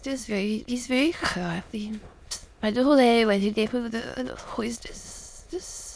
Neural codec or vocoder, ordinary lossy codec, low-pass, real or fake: autoencoder, 22.05 kHz, a latent of 192 numbers a frame, VITS, trained on many speakers; none; none; fake